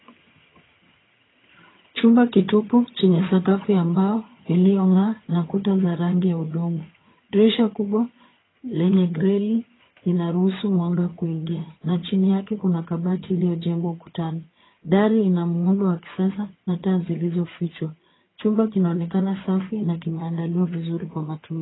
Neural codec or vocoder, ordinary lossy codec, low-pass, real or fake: vocoder, 22.05 kHz, 80 mel bands, HiFi-GAN; AAC, 16 kbps; 7.2 kHz; fake